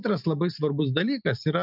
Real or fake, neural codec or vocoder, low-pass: real; none; 5.4 kHz